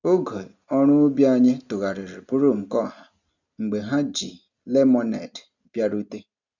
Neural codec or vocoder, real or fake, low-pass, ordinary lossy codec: none; real; 7.2 kHz; none